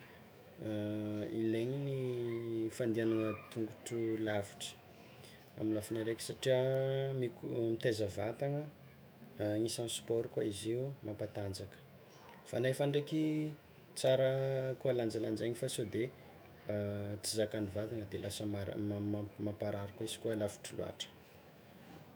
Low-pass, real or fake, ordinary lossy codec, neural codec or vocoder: none; fake; none; autoencoder, 48 kHz, 128 numbers a frame, DAC-VAE, trained on Japanese speech